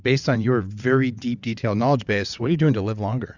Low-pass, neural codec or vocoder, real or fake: 7.2 kHz; vocoder, 22.05 kHz, 80 mel bands, WaveNeXt; fake